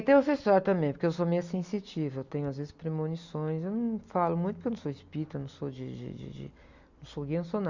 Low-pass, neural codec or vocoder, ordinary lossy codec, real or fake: 7.2 kHz; none; Opus, 64 kbps; real